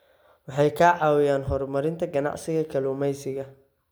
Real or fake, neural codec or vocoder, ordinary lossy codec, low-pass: real; none; none; none